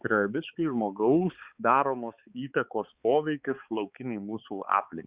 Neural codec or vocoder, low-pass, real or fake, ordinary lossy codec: codec, 16 kHz, 4 kbps, X-Codec, HuBERT features, trained on balanced general audio; 3.6 kHz; fake; Opus, 64 kbps